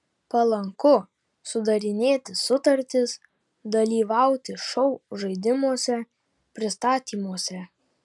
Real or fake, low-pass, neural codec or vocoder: real; 10.8 kHz; none